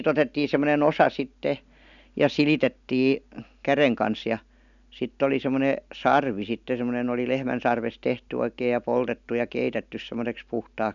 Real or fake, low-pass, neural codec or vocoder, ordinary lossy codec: real; 7.2 kHz; none; none